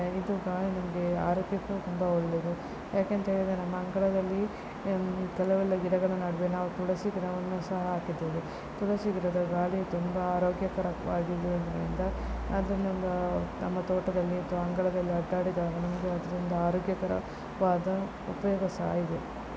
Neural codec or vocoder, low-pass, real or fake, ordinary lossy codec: none; none; real; none